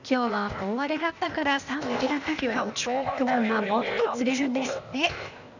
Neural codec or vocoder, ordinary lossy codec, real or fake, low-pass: codec, 16 kHz, 0.8 kbps, ZipCodec; none; fake; 7.2 kHz